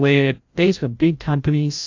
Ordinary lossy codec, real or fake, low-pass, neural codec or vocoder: AAC, 48 kbps; fake; 7.2 kHz; codec, 16 kHz, 0.5 kbps, FreqCodec, larger model